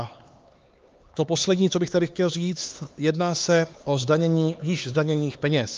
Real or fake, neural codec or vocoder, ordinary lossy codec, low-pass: fake; codec, 16 kHz, 4 kbps, X-Codec, HuBERT features, trained on LibriSpeech; Opus, 32 kbps; 7.2 kHz